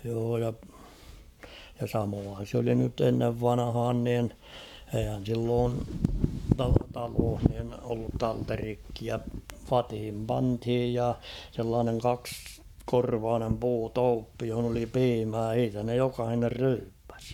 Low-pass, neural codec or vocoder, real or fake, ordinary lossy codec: 19.8 kHz; codec, 44.1 kHz, 7.8 kbps, Pupu-Codec; fake; none